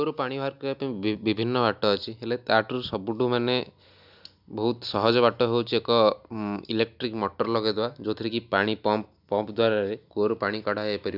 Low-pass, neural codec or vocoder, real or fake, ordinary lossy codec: 5.4 kHz; none; real; none